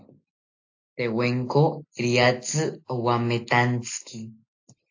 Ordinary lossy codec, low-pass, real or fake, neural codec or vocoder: AAC, 48 kbps; 7.2 kHz; real; none